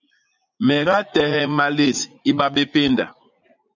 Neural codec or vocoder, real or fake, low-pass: vocoder, 44.1 kHz, 80 mel bands, Vocos; fake; 7.2 kHz